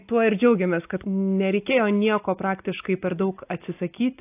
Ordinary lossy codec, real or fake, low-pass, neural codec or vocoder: AAC, 24 kbps; real; 3.6 kHz; none